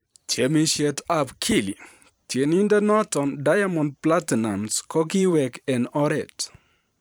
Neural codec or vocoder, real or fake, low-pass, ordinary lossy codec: vocoder, 44.1 kHz, 128 mel bands every 512 samples, BigVGAN v2; fake; none; none